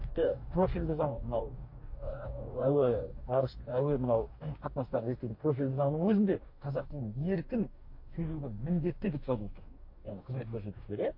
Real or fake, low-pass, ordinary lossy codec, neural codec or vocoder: fake; 5.4 kHz; MP3, 32 kbps; codec, 44.1 kHz, 2.6 kbps, DAC